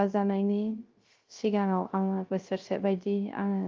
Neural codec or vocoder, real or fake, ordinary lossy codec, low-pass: codec, 16 kHz, 0.3 kbps, FocalCodec; fake; Opus, 32 kbps; 7.2 kHz